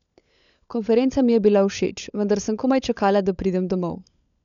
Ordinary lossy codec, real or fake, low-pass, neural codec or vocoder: none; fake; 7.2 kHz; codec, 16 kHz, 16 kbps, FunCodec, trained on LibriTTS, 50 frames a second